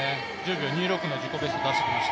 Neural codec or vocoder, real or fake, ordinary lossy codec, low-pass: none; real; none; none